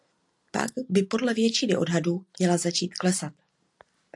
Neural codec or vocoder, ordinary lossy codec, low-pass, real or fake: none; AAC, 64 kbps; 10.8 kHz; real